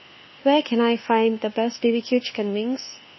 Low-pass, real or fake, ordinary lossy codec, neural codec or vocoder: 7.2 kHz; fake; MP3, 24 kbps; codec, 24 kHz, 1.2 kbps, DualCodec